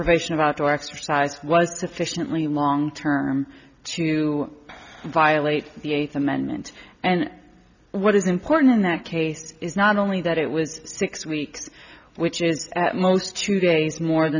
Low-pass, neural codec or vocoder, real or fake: 7.2 kHz; none; real